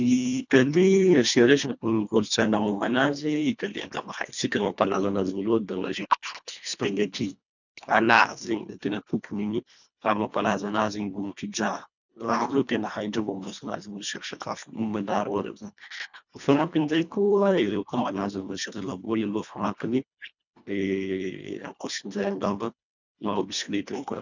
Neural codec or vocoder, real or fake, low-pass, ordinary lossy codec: codec, 24 kHz, 1.5 kbps, HILCodec; fake; 7.2 kHz; none